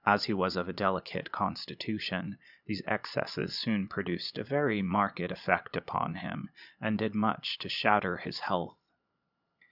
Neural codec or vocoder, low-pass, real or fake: vocoder, 22.05 kHz, 80 mel bands, Vocos; 5.4 kHz; fake